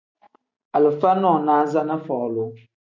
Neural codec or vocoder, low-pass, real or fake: none; 7.2 kHz; real